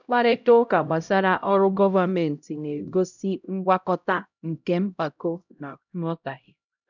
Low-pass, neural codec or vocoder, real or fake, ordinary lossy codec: 7.2 kHz; codec, 16 kHz, 0.5 kbps, X-Codec, HuBERT features, trained on LibriSpeech; fake; none